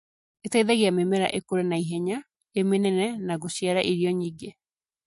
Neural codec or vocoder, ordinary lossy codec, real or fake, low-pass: none; MP3, 48 kbps; real; 14.4 kHz